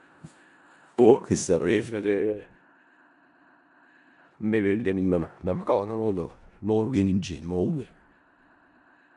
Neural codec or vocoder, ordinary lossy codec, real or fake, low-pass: codec, 16 kHz in and 24 kHz out, 0.4 kbps, LongCat-Audio-Codec, four codebook decoder; none; fake; 10.8 kHz